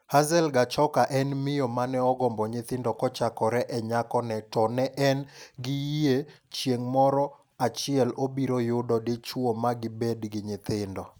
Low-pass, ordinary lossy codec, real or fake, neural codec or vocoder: none; none; real; none